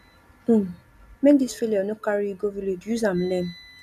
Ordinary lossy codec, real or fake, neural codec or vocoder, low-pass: none; real; none; 14.4 kHz